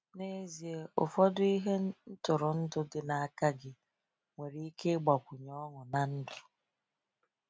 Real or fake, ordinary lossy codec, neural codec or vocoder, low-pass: real; none; none; none